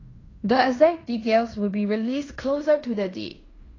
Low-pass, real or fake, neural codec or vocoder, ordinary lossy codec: 7.2 kHz; fake; codec, 16 kHz in and 24 kHz out, 0.9 kbps, LongCat-Audio-Codec, fine tuned four codebook decoder; AAC, 32 kbps